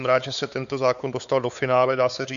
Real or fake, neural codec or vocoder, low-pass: fake; codec, 16 kHz, 4 kbps, X-Codec, WavLM features, trained on Multilingual LibriSpeech; 7.2 kHz